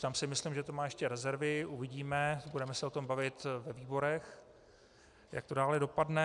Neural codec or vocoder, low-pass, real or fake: none; 10.8 kHz; real